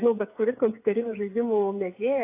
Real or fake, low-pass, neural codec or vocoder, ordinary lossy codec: fake; 3.6 kHz; codec, 16 kHz, 8 kbps, FreqCodec, smaller model; AAC, 24 kbps